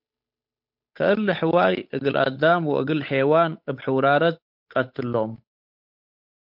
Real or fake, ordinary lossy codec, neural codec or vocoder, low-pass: fake; MP3, 48 kbps; codec, 16 kHz, 8 kbps, FunCodec, trained on Chinese and English, 25 frames a second; 5.4 kHz